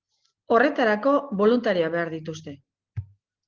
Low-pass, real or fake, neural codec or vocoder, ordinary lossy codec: 7.2 kHz; real; none; Opus, 16 kbps